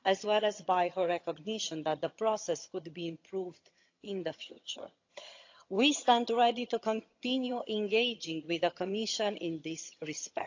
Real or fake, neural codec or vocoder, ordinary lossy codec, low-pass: fake; vocoder, 22.05 kHz, 80 mel bands, HiFi-GAN; AAC, 48 kbps; 7.2 kHz